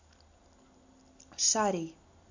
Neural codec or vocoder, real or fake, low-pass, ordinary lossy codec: none; real; 7.2 kHz; AAC, 48 kbps